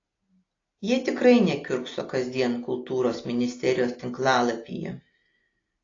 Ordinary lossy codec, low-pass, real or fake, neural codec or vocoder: AAC, 32 kbps; 7.2 kHz; real; none